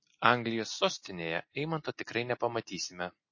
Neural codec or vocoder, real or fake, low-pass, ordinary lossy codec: none; real; 7.2 kHz; MP3, 32 kbps